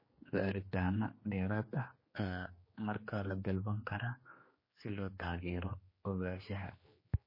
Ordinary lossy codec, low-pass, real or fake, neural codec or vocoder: MP3, 24 kbps; 5.4 kHz; fake; codec, 16 kHz, 2 kbps, X-Codec, HuBERT features, trained on general audio